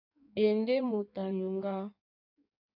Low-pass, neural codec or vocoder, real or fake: 5.4 kHz; codec, 16 kHz in and 24 kHz out, 1.1 kbps, FireRedTTS-2 codec; fake